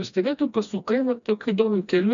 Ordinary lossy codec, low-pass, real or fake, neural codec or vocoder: MP3, 64 kbps; 7.2 kHz; fake; codec, 16 kHz, 1 kbps, FreqCodec, smaller model